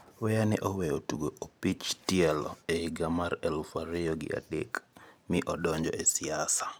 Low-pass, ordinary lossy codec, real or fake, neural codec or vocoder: none; none; fake; vocoder, 44.1 kHz, 128 mel bands every 512 samples, BigVGAN v2